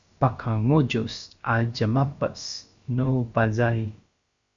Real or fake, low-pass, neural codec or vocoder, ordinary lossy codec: fake; 7.2 kHz; codec, 16 kHz, about 1 kbps, DyCAST, with the encoder's durations; Opus, 64 kbps